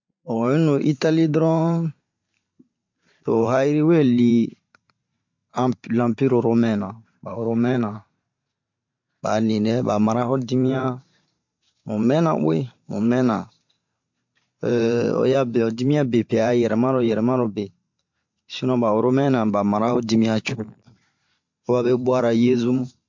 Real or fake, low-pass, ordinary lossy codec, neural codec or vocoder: fake; 7.2 kHz; MP3, 48 kbps; vocoder, 44.1 kHz, 128 mel bands every 512 samples, BigVGAN v2